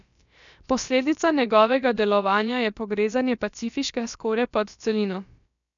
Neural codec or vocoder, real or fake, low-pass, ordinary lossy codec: codec, 16 kHz, about 1 kbps, DyCAST, with the encoder's durations; fake; 7.2 kHz; none